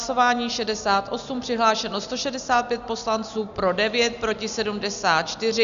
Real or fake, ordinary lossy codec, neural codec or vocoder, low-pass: real; AAC, 96 kbps; none; 7.2 kHz